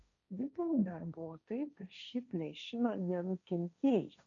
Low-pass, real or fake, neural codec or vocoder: 7.2 kHz; fake; codec, 16 kHz, 1.1 kbps, Voila-Tokenizer